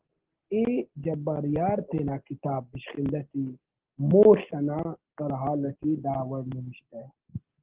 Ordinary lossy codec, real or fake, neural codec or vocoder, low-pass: Opus, 16 kbps; real; none; 3.6 kHz